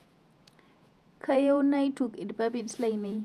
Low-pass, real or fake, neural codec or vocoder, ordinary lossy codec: 14.4 kHz; fake; vocoder, 48 kHz, 128 mel bands, Vocos; none